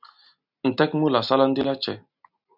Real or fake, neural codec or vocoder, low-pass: real; none; 5.4 kHz